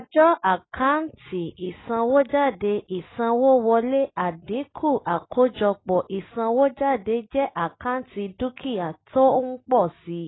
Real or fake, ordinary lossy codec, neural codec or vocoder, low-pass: real; AAC, 16 kbps; none; 7.2 kHz